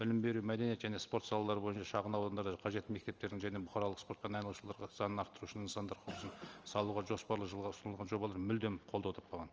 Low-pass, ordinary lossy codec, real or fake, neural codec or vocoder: 7.2 kHz; Opus, 24 kbps; real; none